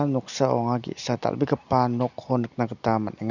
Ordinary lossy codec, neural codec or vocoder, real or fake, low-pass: none; none; real; 7.2 kHz